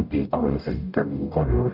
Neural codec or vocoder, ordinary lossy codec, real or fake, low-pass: codec, 44.1 kHz, 0.9 kbps, DAC; none; fake; 5.4 kHz